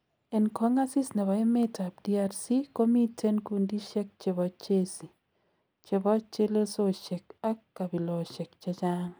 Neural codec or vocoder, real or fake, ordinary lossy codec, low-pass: none; real; none; none